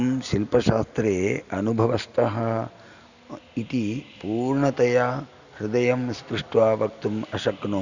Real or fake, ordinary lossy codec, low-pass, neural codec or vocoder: real; none; 7.2 kHz; none